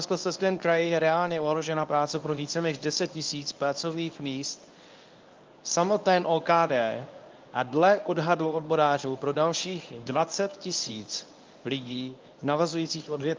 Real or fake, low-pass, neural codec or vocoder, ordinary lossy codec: fake; 7.2 kHz; codec, 24 kHz, 0.9 kbps, WavTokenizer, medium speech release version 1; Opus, 24 kbps